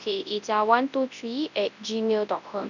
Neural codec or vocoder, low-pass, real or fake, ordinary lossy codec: codec, 24 kHz, 0.9 kbps, WavTokenizer, large speech release; 7.2 kHz; fake; none